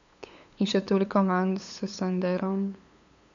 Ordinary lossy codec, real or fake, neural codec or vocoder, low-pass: AAC, 64 kbps; fake; codec, 16 kHz, 8 kbps, FunCodec, trained on LibriTTS, 25 frames a second; 7.2 kHz